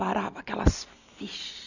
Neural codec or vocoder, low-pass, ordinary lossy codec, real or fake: none; 7.2 kHz; none; real